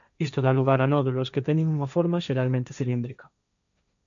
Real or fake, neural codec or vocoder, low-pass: fake; codec, 16 kHz, 1.1 kbps, Voila-Tokenizer; 7.2 kHz